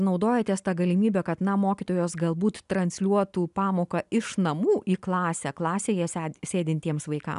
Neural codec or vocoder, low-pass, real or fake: none; 10.8 kHz; real